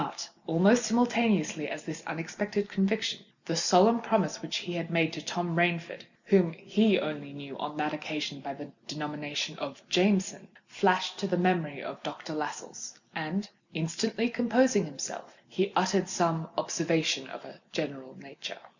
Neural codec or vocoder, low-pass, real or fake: none; 7.2 kHz; real